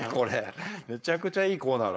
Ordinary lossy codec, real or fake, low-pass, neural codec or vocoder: none; fake; none; codec, 16 kHz, 4.8 kbps, FACodec